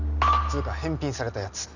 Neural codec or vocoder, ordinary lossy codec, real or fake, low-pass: none; none; real; 7.2 kHz